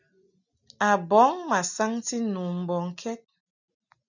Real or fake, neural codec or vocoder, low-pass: real; none; 7.2 kHz